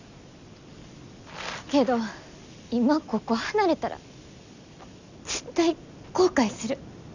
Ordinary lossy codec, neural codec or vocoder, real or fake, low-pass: none; none; real; 7.2 kHz